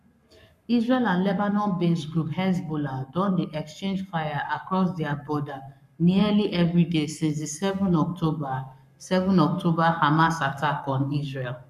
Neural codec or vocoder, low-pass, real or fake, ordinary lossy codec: codec, 44.1 kHz, 7.8 kbps, Pupu-Codec; 14.4 kHz; fake; none